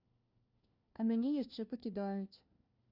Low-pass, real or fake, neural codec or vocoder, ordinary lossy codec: 5.4 kHz; fake; codec, 16 kHz, 1 kbps, FunCodec, trained on LibriTTS, 50 frames a second; Opus, 64 kbps